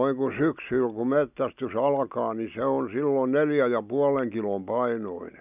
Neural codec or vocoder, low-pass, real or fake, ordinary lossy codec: none; 3.6 kHz; real; none